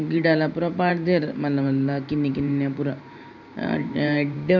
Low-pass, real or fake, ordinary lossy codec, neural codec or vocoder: 7.2 kHz; fake; none; vocoder, 44.1 kHz, 128 mel bands every 512 samples, BigVGAN v2